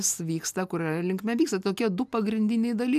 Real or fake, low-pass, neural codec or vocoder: real; 14.4 kHz; none